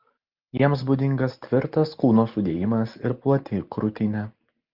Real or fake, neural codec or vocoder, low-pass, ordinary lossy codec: real; none; 5.4 kHz; Opus, 24 kbps